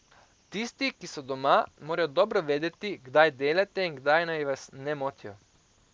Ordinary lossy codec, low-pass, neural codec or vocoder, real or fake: none; none; none; real